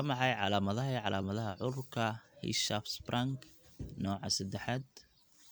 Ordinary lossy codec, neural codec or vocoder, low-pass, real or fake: none; none; none; real